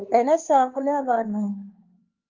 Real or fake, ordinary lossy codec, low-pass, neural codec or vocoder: fake; Opus, 32 kbps; 7.2 kHz; codec, 16 kHz, 4 kbps, X-Codec, HuBERT features, trained on LibriSpeech